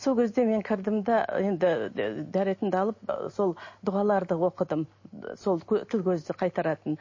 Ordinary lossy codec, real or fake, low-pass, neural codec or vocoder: MP3, 32 kbps; real; 7.2 kHz; none